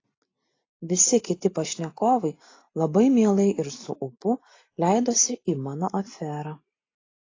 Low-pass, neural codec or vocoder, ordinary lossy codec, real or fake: 7.2 kHz; none; AAC, 32 kbps; real